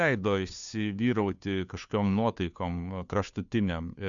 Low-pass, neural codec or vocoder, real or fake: 7.2 kHz; codec, 16 kHz, 2 kbps, FunCodec, trained on Chinese and English, 25 frames a second; fake